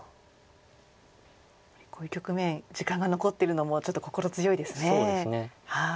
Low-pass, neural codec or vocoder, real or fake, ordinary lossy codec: none; none; real; none